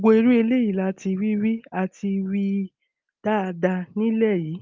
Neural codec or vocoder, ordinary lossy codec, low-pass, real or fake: none; Opus, 32 kbps; 7.2 kHz; real